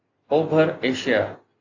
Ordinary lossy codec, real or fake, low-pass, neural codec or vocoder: AAC, 48 kbps; real; 7.2 kHz; none